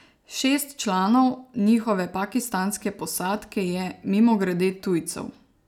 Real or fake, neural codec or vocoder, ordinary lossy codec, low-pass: real; none; none; 19.8 kHz